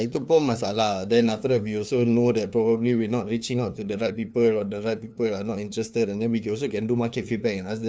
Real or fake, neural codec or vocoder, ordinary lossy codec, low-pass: fake; codec, 16 kHz, 2 kbps, FunCodec, trained on LibriTTS, 25 frames a second; none; none